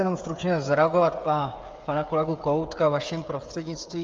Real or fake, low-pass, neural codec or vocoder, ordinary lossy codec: fake; 7.2 kHz; codec, 16 kHz, 16 kbps, FreqCodec, smaller model; Opus, 32 kbps